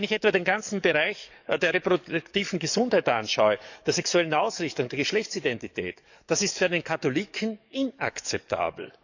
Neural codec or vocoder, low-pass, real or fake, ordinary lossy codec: codec, 44.1 kHz, 7.8 kbps, DAC; 7.2 kHz; fake; none